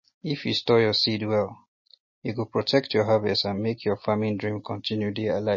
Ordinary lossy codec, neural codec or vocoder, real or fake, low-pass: MP3, 32 kbps; none; real; 7.2 kHz